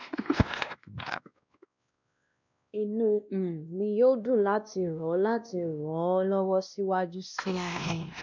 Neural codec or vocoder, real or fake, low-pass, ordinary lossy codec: codec, 16 kHz, 1 kbps, X-Codec, WavLM features, trained on Multilingual LibriSpeech; fake; 7.2 kHz; none